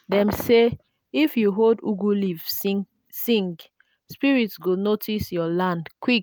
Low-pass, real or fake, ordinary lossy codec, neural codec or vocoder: none; real; none; none